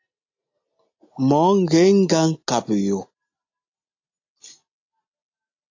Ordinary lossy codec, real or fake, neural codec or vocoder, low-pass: AAC, 48 kbps; real; none; 7.2 kHz